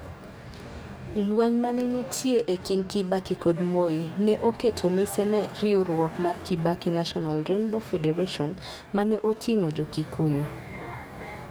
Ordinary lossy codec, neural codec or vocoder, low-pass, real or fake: none; codec, 44.1 kHz, 2.6 kbps, DAC; none; fake